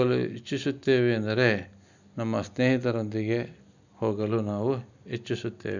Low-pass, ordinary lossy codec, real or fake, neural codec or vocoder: 7.2 kHz; none; real; none